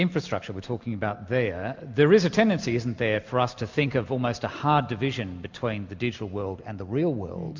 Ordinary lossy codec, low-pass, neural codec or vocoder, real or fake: MP3, 64 kbps; 7.2 kHz; none; real